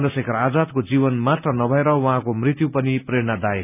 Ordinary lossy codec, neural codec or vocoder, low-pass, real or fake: none; none; 3.6 kHz; real